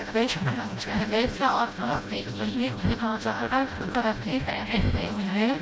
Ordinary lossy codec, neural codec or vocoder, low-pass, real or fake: none; codec, 16 kHz, 0.5 kbps, FreqCodec, smaller model; none; fake